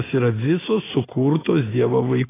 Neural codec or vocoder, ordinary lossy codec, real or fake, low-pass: none; AAC, 16 kbps; real; 3.6 kHz